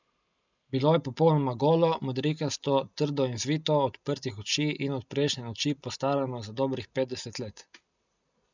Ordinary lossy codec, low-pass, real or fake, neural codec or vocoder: none; 7.2 kHz; real; none